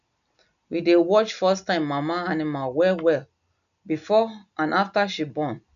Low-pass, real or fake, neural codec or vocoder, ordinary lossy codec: 7.2 kHz; real; none; none